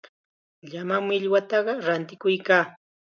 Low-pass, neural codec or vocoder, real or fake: 7.2 kHz; none; real